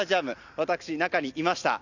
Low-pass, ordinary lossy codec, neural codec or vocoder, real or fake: 7.2 kHz; none; none; real